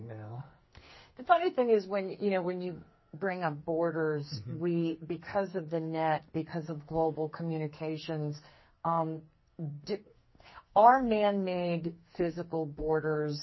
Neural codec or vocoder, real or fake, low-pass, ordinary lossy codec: codec, 44.1 kHz, 2.6 kbps, SNAC; fake; 7.2 kHz; MP3, 24 kbps